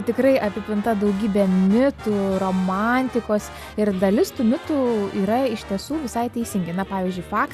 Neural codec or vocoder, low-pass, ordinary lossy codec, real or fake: none; 14.4 kHz; Opus, 64 kbps; real